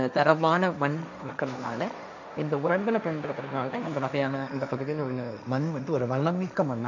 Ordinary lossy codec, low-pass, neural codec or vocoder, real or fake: none; 7.2 kHz; codec, 16 kHz, 1.1 kbps, Voila-Tokenizer; fake